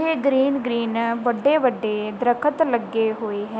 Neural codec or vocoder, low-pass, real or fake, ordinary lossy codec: none; none; real; none